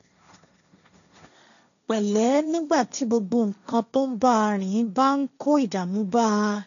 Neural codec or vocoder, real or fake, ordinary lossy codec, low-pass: codec, 16 kHz, 1.1 kbps, Voila-Tokenizer; fake; none; 7.2 kHz